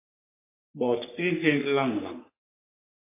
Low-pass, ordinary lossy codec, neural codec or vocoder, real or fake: 3.6 kHz; MP3, 24 kbps; codec, 44.1 kHz, 3.4 kbps, Pupu-Codec; fake